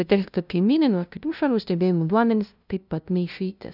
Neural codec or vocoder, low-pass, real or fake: codec, 16 kHz, 0.5 kbps, FunCodec, trained on LibriTTS, 25 frames a second; 5.4 kHz; fake